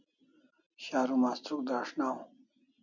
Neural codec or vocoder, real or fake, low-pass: none; real; 7.2 kHz